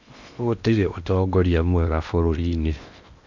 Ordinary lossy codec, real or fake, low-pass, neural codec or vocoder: none; fake; 7.2 kHz; codec, 16 kHz in and 24 kHz out, 0.8 kbps, FocalCodec, streaming, 65536 codes